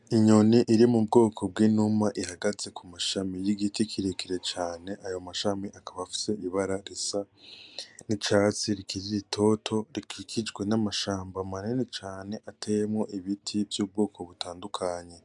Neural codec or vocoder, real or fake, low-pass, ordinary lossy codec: none; real; 10.8 kHz; MP3, 96 kbps